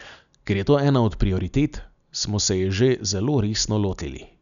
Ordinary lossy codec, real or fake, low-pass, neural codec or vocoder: none; real; 7.2 kHz; none